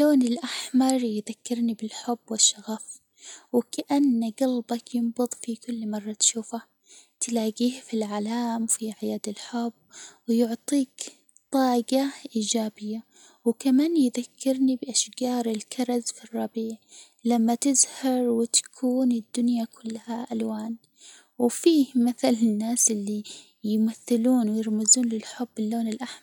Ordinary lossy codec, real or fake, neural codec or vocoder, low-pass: none; real; none; none